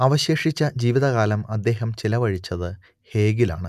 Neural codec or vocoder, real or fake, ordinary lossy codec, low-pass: none; real; none; 14.4 kHz